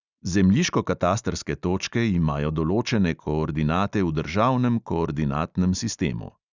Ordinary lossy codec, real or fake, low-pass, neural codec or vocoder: Opus, 64 kbps; real; 7.2 kHz; none